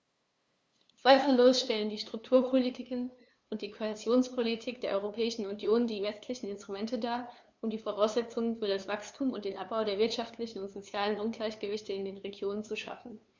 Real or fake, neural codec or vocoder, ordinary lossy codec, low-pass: fake; codec, 16 kHz, 2 kbps, FunCodec, trained on LibriTTS, 25 frames a second; none; none